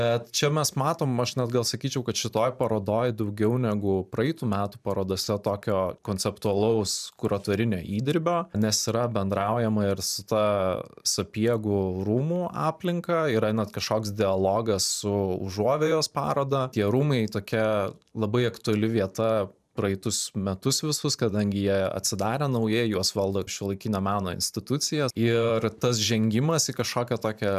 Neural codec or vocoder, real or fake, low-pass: vocoder, 44.1 kHz, 128 mel bands every 512 samples, BigVGAN v2; fake; 14.4 kHz